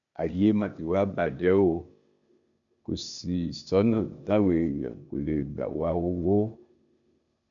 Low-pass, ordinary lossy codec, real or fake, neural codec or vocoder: 7.2 kHz; none; fake; codec, 16 kHz, 0.8 kbps, ZipCodec